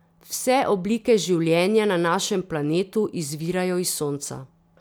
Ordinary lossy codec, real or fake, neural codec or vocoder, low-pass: none; real; none; none